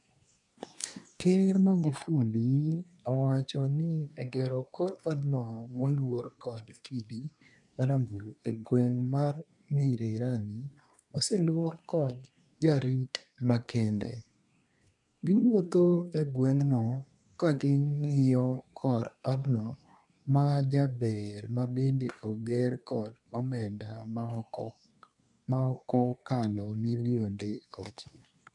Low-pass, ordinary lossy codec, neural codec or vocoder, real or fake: 10.8 kHz; none; codec, 24 kHz, 1 kbps, SNAC; fake